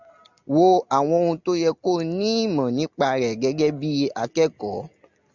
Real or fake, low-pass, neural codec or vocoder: real; 7.2 kHz; none